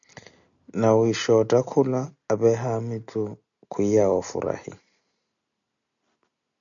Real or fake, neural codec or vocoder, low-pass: real; none; 7.2 kHz